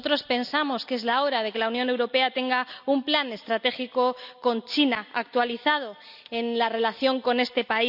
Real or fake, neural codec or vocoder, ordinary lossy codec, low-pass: real; none; none; 5.4 kHz